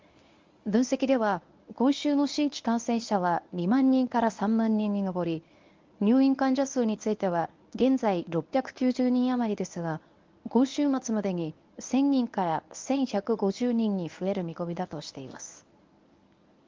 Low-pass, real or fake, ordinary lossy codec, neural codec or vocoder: 7.2 kHz; fake; Opus, 32 kbps; codec, 24 kHz, 0.9 kbps, WavTokenizer, medium speech release version 1